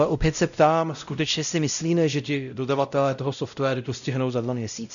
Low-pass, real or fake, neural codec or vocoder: 7.2 kHz; fake; codec, 16 kHz, 0.5 kbps, X-Codec, WavLM features, trained on Multilingual LibriSpeech